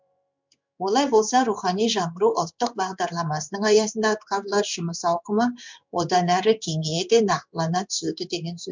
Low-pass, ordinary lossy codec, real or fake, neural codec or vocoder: 7.2 kHz; MP3, 64 kbps; fake; codec, 16 kHz in and 24 kHz out, 1 kbps, XY-Tokenizer